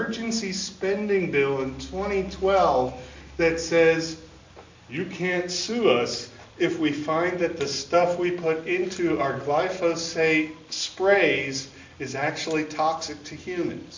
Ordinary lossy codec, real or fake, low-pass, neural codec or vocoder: MP3, 48 kbps; real; 7.2 kHz; none